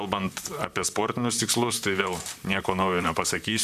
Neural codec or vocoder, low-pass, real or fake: vocoder, 44.1 kHz, 128 mel bands, Pupu-Vocoder; 14.4 kHz; fake